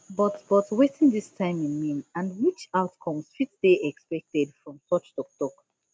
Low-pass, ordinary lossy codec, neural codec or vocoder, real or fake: none; none; none; real